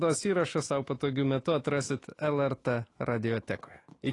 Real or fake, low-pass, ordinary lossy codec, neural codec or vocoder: fake; 10.8 kHz; AAC, 32 kbps; autoencoder, 48 kHz, 128 numbers a frame, DAC-VAE, trained on Japanese speech